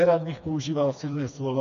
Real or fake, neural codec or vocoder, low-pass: fake; codec, 16 kHz, 2 kbps, FreqCodec, smaller model; 7.2 kHz